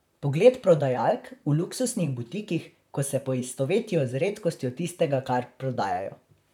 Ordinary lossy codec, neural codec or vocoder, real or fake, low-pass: none; vocoder, 44.1 kHz, 128 mel bands, Pupu-Vocoder; fake; 19.8 kHz